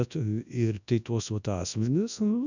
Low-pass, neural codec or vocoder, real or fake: 7.2 kHz; codec, 24 kHz, 0.9 kbps, WavTokenizer, large speech release; fake